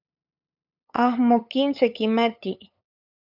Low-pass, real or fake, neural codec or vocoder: 5.4 kHz; fake; codec, 16 kHz, 8 kbps, FunCodec, trained on LibriTTS, 25 frames a second